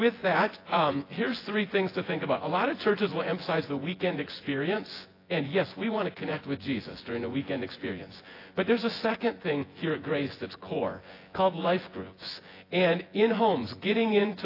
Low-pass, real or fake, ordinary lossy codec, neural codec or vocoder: 5.4 kHz; fake; AAC, 24 kbps; vocoder, 24 kHz, 100 mel bands, Vocos